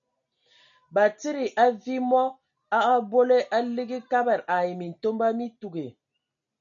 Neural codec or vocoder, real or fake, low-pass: none; real; 7.2 kHz